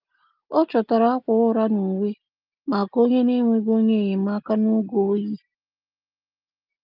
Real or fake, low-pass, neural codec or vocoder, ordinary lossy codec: real; 5.4 kHz; none; Opus, 32 kbps